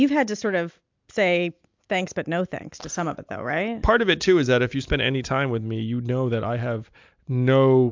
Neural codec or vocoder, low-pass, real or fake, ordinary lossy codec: none; 7.2 kHz; real; MP3, 64 kbps